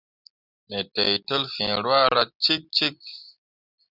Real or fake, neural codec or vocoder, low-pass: real; none; 5.4 kHz